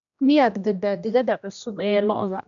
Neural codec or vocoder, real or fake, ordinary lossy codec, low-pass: codec, 16 kHz, 1 kbps, X-Codec, HuBERT features, trained on general audio; fake; none; 7.2 kHz